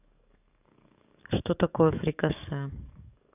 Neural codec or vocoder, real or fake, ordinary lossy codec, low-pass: codec, 44.1 kHz, 7.8 kbps, DAC; fake; none; 3.6 kHz